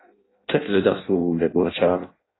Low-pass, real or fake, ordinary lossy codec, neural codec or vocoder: 7.2 kHz; fake; AAC, 16 kbps; codec, 16 kHz in and 24 kHz out, 0.6 kbps, FireRedTTS-2 codec